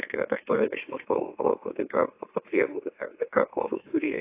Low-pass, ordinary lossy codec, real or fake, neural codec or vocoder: 3.6 kHz; AAC, 24 kbps; fake; autoencoder, 44.1 kHz, a latent of 192 numbers a frame, MeloTTS